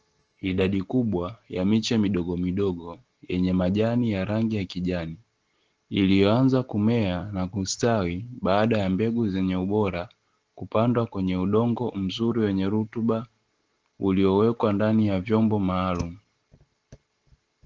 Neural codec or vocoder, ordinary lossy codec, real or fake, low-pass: none; Opus, 16 kbps; real; 7.2 kHz